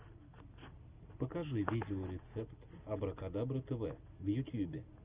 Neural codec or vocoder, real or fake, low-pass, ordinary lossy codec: none; real; 3.6 kHz; Opus, 32 kbps